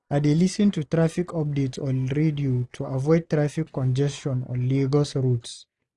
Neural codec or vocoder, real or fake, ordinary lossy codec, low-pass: none; real; none; none